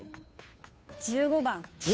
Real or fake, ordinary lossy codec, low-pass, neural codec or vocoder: fake; none; none; codec, 16 kHz, 2 kbps, FunCodec, trained on Chinese and English, 25 frames a second